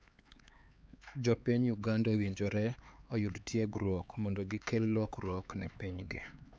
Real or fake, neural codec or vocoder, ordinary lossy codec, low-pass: fake; codec, 16 kHz, 4 kbps, X-Codec, HuBERT features, trained on LibriSpeech; none; none